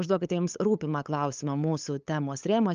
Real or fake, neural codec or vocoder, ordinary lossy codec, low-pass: fake; codec, 16 kHz, 8 kbps, FunCodec, trained on LibriTTS, 25 frames a second; Opus, 24 kbps; 7.2 kHz